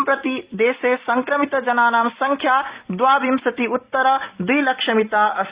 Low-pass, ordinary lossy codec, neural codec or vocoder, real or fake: 3.6 kHz; Opus, 64 kbps; none; real